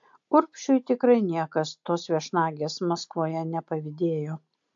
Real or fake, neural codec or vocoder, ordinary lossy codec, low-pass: real; none; AAC, 64 kbps; 7.2 kHz